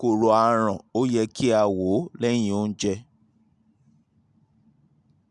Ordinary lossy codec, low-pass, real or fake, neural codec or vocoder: none; 10.8 kHz; real; none